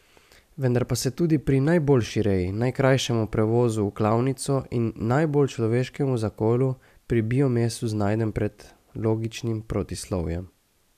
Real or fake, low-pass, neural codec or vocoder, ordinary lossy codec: real; 14.4 kHz; none; none